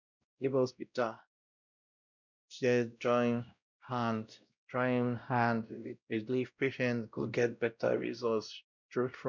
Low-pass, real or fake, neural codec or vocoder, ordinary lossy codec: 7.2 kHz; fake; codec, 16 kHz, 0.5 kbps, X-Codec, WavLM features, trained on Multilingual LibriSpeech; none